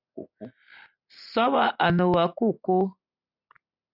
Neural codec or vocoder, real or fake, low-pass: none; real; 5.4 kHz